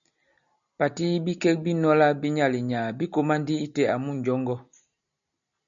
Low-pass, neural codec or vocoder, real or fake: 7.2 kHz; none; real